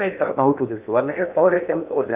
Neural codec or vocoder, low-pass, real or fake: codec, 16 kHz in and 24 kHz out, 0.8 kbps, FocalCodec, streaming, 65536 codes; 3.6 kHz; fake